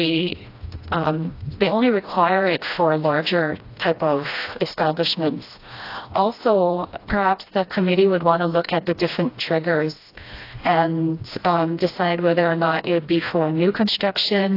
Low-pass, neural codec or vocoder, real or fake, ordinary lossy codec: 5.4 kHz; codec, 16 kHz, 1 kbps, FreqCodec, smaller model; fake; AAC, 32 kbps